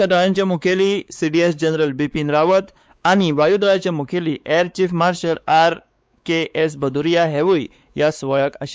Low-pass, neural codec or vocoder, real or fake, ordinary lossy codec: none; codec, 16 kHz, 4 kbps, X-Codec, WavLM features, trained on Multilingual LibriSpeech; fake; none